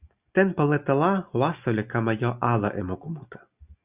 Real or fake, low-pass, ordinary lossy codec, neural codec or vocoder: real; 3.6 kHz; Opus, 64 kbps; none